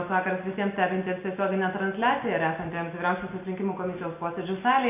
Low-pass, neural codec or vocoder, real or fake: 3.6 kHz; none; real